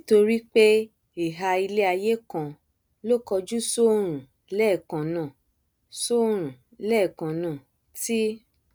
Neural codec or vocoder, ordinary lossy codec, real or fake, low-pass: none; none; real; none